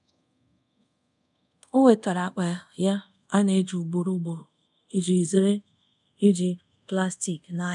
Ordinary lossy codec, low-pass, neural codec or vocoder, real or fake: none; 10.8 kHz; codec, 24 kHz, 0.5 kbps, DualCodec; fake